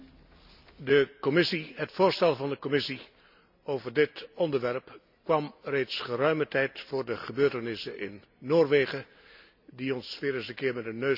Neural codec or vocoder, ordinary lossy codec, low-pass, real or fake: none; none; 5.4 kHz; real